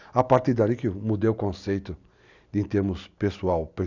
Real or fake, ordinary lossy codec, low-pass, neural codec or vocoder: real; none; 7.2 kHz; none